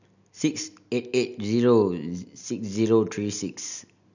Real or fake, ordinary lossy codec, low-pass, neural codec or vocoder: real; none; 7.2 kHz; none